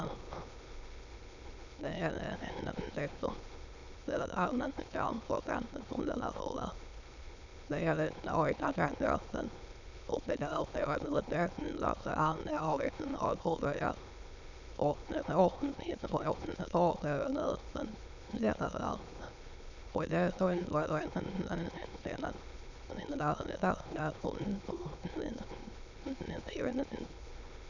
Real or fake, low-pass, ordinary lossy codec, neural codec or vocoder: fake; 7.2 kHz; none; autoencoder, 22.05 kHz, a latent of 192 numbers a frame, VITS, trained on many speakers